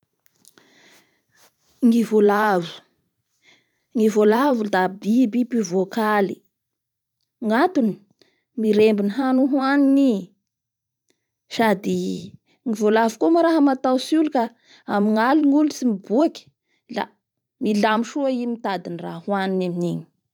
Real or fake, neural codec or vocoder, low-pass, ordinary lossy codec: fake; vocoder, 44.1 kHz, 128 mel bands every 512 samples, BigVGAN v2; 19.8 kHz; none